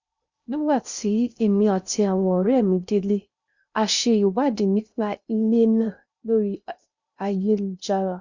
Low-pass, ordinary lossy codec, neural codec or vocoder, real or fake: 7.2 kHz; Opus, 64 kbps; codec, 16 kHz in and 24 kHz out, 0.6 kbps, FocalCodec, streaming, 2048 codes; fake